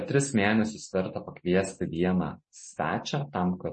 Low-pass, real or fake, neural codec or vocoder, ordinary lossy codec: 10.8 kHz; real; none; MP3, 32 kbps